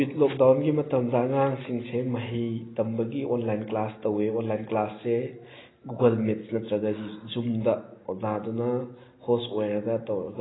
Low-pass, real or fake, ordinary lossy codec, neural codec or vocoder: 7.2 kHz; real; AAC, 16 kbps; none